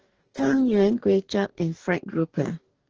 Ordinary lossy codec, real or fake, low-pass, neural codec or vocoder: Opus, 16 kbps; fake; 7.2 kHz; codec, 44.1 kHz, 2.6 kbps, DAC